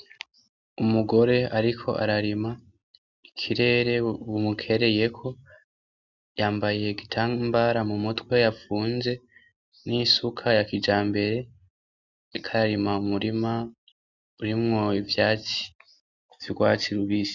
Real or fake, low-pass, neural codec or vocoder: real; 7.2 kHz; none